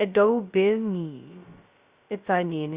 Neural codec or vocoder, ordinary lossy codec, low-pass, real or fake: codec, 16 kHz, 0.2 kbps, FocalCodec; Opus, 64 kbps; 3.6 kHz; fake